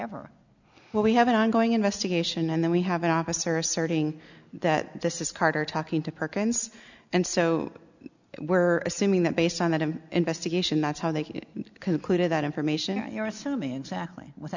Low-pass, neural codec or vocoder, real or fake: 7.2 kHz; none; real